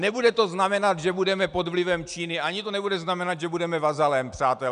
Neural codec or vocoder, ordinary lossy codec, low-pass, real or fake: none; MP3, 96 kbps; 9.9 kHz; real